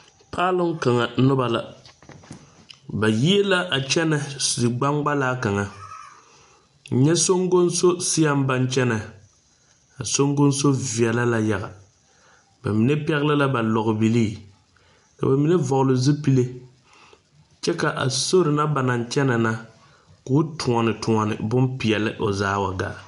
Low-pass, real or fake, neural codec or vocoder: 10.8 kHz; real; none